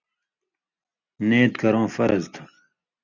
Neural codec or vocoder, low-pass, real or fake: none; 7.2 kHz; real